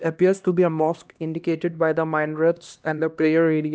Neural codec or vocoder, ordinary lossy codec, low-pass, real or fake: codec, 16 kHz, 1 kbps, X-Codec, HuBERT features, trained on LibriSpeech; none; none; fake